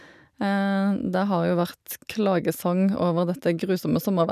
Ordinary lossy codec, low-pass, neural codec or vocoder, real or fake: none; 14.4 kHz; none; real